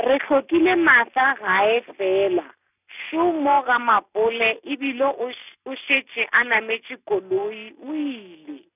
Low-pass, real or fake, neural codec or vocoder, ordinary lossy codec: 3.6 kHz; real; none; none